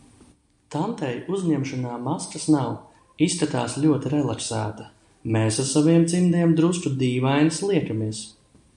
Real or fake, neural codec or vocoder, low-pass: real; none; 10.8 kHz